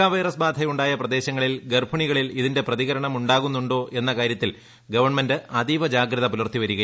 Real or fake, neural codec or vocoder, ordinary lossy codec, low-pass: real; none; none; none